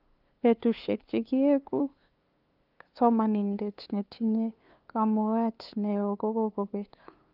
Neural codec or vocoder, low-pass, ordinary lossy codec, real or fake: codec, 16 kHz, 2 kbps, FunCodec, trained on LibriTTS, 25 frames a second; 5.4 kHz; Opus, 24 kbps; fake